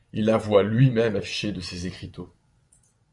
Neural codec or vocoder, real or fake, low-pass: vocoder, 24 kHz, 100 mel bands, Vocos; fake; 10.8 kHz